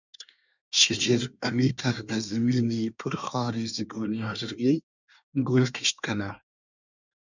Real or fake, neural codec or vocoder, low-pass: fake; codec, 24 kHz, 1 kbps, SNAC; 7.2 kHz